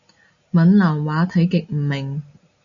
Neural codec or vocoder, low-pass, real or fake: none; 7.2 kHz; real